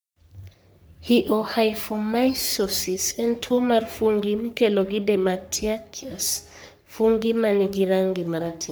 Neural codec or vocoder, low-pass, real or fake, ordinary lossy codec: codec, 44.1 kHz, 3.4 kbps, Pupu-Codec; none; fake; none